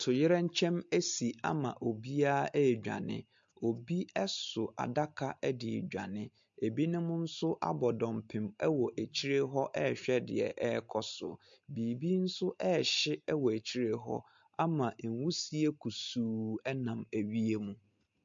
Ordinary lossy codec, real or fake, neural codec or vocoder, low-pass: MP3, 48 kbps; real; none; 7.2 kHz